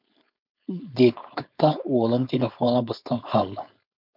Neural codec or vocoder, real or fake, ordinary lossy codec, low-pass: codec, 16 kHz, 4.8 kbps, FACodec; fake; MP3, 48 kbps; 5.4 kHz